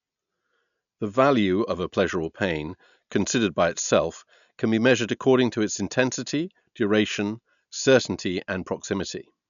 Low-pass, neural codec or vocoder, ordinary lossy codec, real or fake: 7.2 kHz; none; none; real